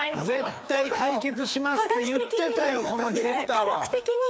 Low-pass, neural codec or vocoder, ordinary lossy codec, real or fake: none; codec, 16 kHz, 2 kbps, FreqCodec, larger model; none; fake